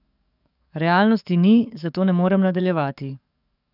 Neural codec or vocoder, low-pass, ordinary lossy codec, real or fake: codec, 44.1 kHz, 7.8 kbps, DAC; 5.4 kHz; none; fake